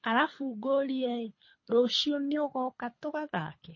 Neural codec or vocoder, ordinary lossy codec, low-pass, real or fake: codec, 24 kHz, 3 kbps, HILCodec; MP3, 32 kbps; 7.2 kHz; fake